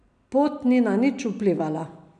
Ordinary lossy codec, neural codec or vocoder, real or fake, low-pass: none; none; real; 9.9 kHz